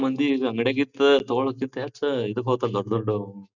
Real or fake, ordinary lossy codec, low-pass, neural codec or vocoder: real; none; 7.2 kHz; none